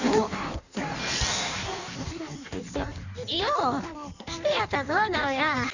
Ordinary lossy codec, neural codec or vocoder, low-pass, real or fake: none; codec, 16 kHz in and 24 kHz out, 1.1 kbps, FireRedTTS-2 codec; 7.2 kHz; fake